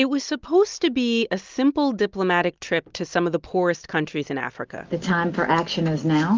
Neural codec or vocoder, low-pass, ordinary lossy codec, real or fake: none; 7.2 kHz; Opus, 32 kbps; real